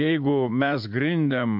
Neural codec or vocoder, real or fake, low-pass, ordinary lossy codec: vocoder, 44.1 kHz, 80 mel bands, Vocos; fake; 5.4 kHz; MP3, 48 kbps